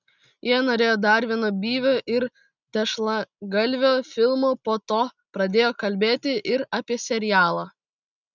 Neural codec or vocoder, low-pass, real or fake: none; 7.2 kHz; real